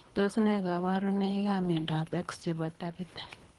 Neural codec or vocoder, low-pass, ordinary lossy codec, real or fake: codec, 24 kHz, 3 kbps, HILCodec; 10.8 kHz; Opus, 24 kbps; fake